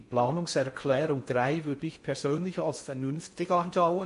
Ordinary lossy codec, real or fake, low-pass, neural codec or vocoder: MP3, 48 kbps; fake; 10.8 kHz; codec, 16 kHz in and 24 kHz out, 0.6 kbps, FocalCodec, streaming, 2048 codes